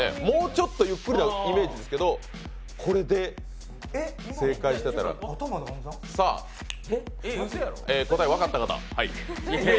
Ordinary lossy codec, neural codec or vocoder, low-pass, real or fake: none; none; none; real